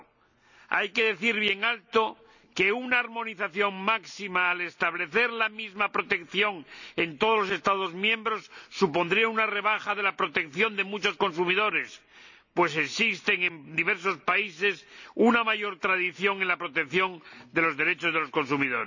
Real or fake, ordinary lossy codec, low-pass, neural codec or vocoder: real; none; 7.2 kHz; none